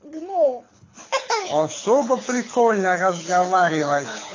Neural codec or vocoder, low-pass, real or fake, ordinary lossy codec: codec, 24 kHz, 6 kbps, HILCodec; 7.2 kHz; fake; AAC, 32 kbps